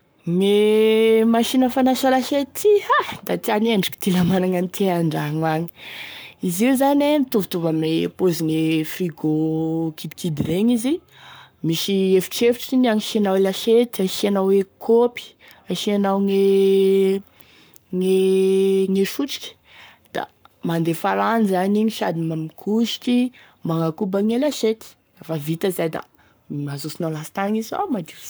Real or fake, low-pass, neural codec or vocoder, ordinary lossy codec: fake; none; codec, 44.1 kHz, 7.8 kbps, Pupu-Codec; none